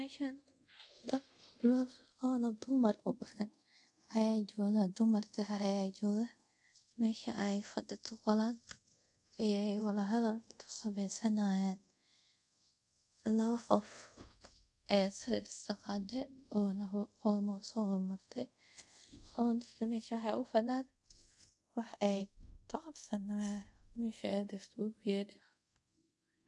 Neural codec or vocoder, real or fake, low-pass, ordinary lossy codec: codec, 24 kHz, 0.5 kbps, DualCodec; fake; 10.8 kHz; none